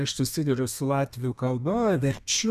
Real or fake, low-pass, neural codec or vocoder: fake; 14.4 kHz; codec, 32 kHz, 1.9 kbps, SNAC